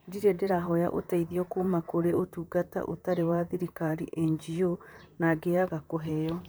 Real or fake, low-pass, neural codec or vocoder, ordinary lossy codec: fake; none; vocoder, 44.1 kHz, 128 mel bands, Pupu-Vocoder; none